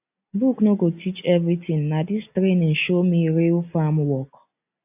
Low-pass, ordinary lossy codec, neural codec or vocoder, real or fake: 3.6 kHz; AAC, 32 kbps; none; real